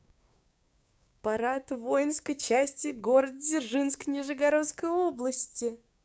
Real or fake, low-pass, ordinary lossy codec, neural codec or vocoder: fake; none; none; codec, 16 kHz, 6 kbps, DAC